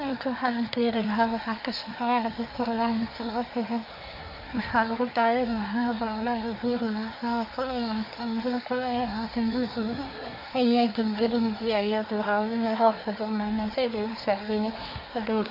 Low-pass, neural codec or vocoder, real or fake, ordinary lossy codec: 5.4 kHz; codec, 24 kHz, 1 kbps, SNAC; fake; none